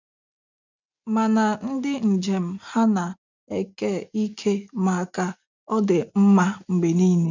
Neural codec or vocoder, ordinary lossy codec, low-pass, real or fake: none; none; 7.2 kHz; real